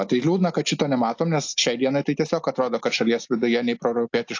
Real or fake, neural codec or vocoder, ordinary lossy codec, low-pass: real; none; AAC, 48 kbps; 7.2 kHz